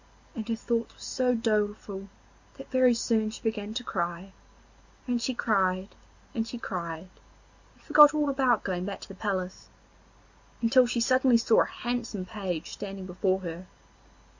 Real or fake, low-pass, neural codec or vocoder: real; 7.2 kHz; none